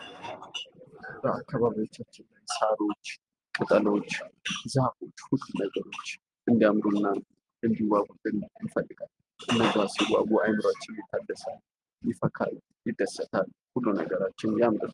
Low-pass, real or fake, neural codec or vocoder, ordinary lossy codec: 10.8 kHz; real; none; Opus, 32 kbps